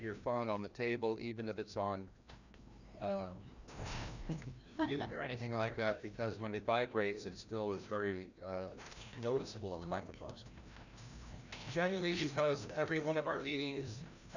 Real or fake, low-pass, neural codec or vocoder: fake; 7.2 kHz; codec, 16 kHz, 1 kbps, FreqCodec, larger model